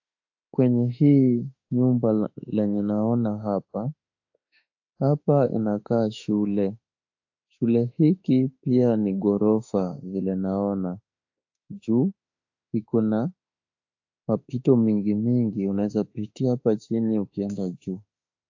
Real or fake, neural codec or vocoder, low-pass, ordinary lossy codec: fake; autoencoder, 48 kHz, 32 numbers a frame, DAC-VAE, trained on Japanese speech; 7.2 kHz; AAC, 48 kbps